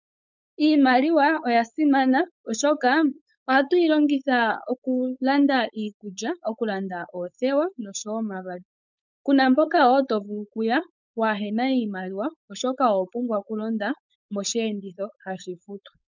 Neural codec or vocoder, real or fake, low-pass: codec, 16 kHz, 4.8 kbps, FACodec; fake; 7.2 kHz